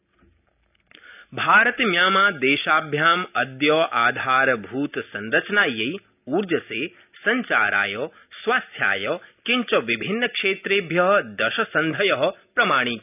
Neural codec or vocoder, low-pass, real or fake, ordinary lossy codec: none; 3.6 kHz; real; none